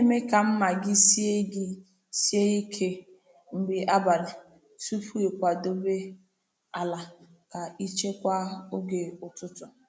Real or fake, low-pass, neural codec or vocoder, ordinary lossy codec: real; none; none; none